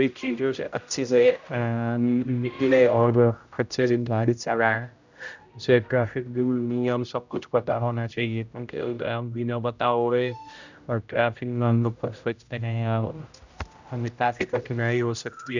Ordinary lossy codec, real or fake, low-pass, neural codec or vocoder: none; fake; 7.2 kHz; codec, 16 kHz, 0.5 kbps, X-Codec, HuBERT features, trained on general audio